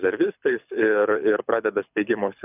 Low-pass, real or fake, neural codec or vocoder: 3.6 kHz; fake; codec, 24 kHz, 6 kbps, HILCodec